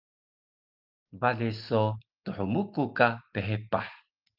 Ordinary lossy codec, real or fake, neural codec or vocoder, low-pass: Opus, 24 kbps; real; none; 5.4 kHz